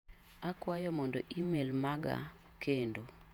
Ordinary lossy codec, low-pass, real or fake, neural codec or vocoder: none; 19.8 kHz; fake; vocoder, 48 kHz, 128 mel bands, Vocos